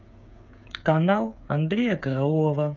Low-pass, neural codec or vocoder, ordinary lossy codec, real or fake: 7.2 kHz; codec, 16 kHz, 8 kbps, FreqCodec, smaller model; none; fake